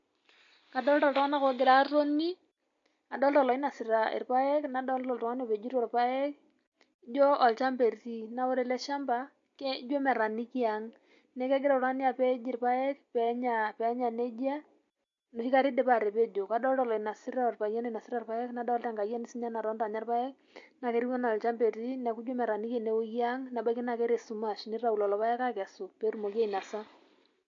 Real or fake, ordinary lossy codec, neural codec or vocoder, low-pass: real; MP3, 48 kbps; none; 7.2 kHz